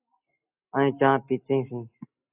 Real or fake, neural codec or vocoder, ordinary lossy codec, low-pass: real; none; AAC, 24 kbps; 3.6 kHz